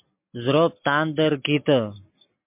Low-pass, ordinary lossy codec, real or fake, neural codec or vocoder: 3.6 kHz; MP3, 32 kbps; real; none